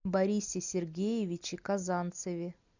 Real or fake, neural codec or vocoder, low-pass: real; none; 7.2 kHz